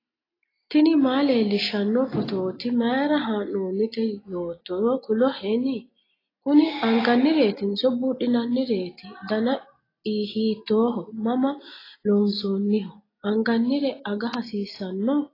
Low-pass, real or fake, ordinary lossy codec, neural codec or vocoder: 5.4 kHz; real; AAC, 24 kbps; none